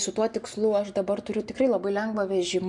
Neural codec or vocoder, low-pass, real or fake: none; 10.8 kHz; real